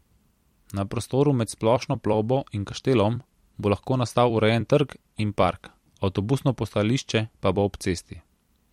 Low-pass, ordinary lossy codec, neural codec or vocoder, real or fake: 19.8 kHz; MP3, 64 kbps; vocoder, 44.1 kHz, 128 mel bands every 256 samples, BigVGAN v2; fake